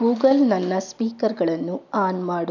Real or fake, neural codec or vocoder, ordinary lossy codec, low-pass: real; none; none; 7.2 kHz